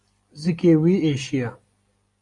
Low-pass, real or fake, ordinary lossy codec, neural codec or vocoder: 10.8 kHz; real; AAC, 48 kbps; none